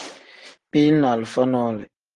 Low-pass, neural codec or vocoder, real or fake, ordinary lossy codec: 10.8 kHz; none; real; Opus, 24 kbps